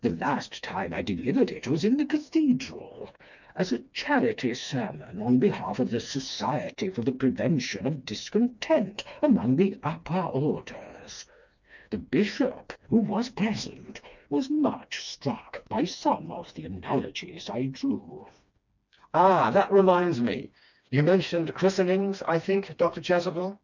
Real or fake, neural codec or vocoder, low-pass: fake; codec, 16 kHz, 2 kbps, FreqCodec, smaller model; 7.2 kHz